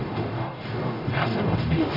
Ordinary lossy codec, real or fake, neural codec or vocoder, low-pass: Opus, 64 kbps; fake; codec, 44.1 kHz, 0.9 kbps, DAC; 5.4 kHz